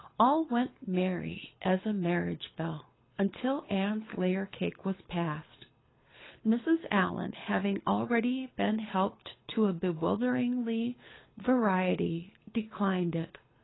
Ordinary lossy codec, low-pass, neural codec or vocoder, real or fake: AAC, 16 kbps; 7.2 kHz; codec, 24 kHz, 6 kbps, HILCodec; fake